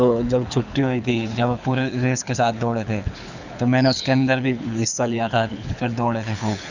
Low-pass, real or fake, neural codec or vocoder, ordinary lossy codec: 7.2 kHz; fake; codec, 24 kHz, 6 kbps, HILCodec; none